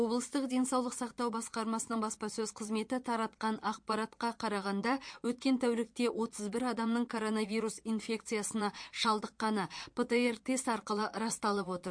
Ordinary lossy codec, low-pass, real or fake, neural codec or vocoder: MP3, 48 kbps; 9.9 kHz; fake; vocoder, 22.05 kHz, 80 mel bands, Vocos